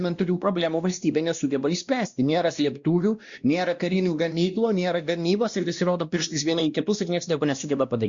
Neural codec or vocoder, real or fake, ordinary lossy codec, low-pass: codec, 16 kHz, 1 kbps, X-Codec, WavLM features, trained on Multilingual LibriSpeech; fake; Opus, 64 kbps; 7.2 kHz